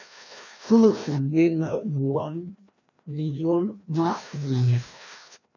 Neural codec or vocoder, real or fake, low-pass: codec, 16 kHz, 1 kbps, FreqCodec, larger model; fake; 7.2 kHz